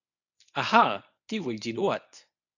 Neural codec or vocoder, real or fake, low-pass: codec, 24 kHz, 0.9 kbps, WavTokenizer, medium speech release version 1; fake; 7.2 kHz